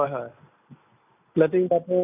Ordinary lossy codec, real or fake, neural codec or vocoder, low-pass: none; real; none; 3.6 kHz